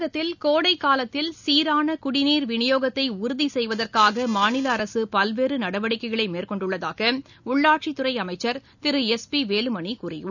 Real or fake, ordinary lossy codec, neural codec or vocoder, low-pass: real; none; none; 7.2 kHz